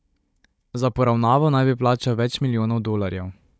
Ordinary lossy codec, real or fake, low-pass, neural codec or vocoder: none; fake; none; codec, 16 kHz, 16 kbps, FunCodec, trained on Chinese and English, 50 frames a second